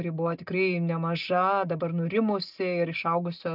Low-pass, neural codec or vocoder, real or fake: 5.4 kHz; none; real